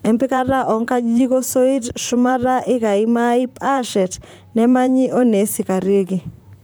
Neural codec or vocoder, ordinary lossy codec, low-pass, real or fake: vocoder, 44.1 kHz, 128 mel bands, Pupu-Vocoder; none; none; fake